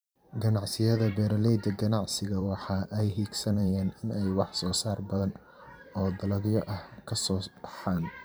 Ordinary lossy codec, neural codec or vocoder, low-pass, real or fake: none; vocoder, 44.1 kHz, 128 mel bands every 512 samples, BigVGAN v2; none; fake